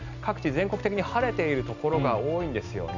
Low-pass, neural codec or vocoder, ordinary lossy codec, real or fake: 7.2 kHz; none; none; real